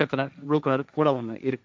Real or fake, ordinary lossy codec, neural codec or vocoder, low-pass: fake; none; codec, 16 kHz, 1.1 kbps, Voila-Tokenizer; none